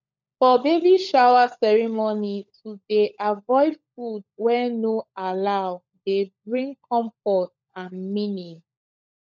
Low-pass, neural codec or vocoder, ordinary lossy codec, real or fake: 7.2 kHz; codec, 16 kHz, 16 kbps, FunCodec, trained on LibriTTS, 50 frames a second; none; fake